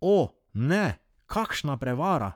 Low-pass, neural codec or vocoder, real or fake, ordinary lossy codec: 19.8 kHz; codec, 44.1 kHz, 7.8 kbps, Pupu-Codec; fake; none